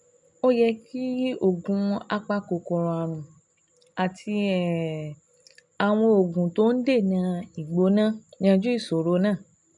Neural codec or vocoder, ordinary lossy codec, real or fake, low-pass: none; none; real; 10.8 kHz